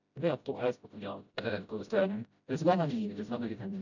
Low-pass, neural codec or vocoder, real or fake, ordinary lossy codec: 7.2 kHz; codec, 16 kHz, 0.5 kbps, FreqCodec, smaller model; fake; none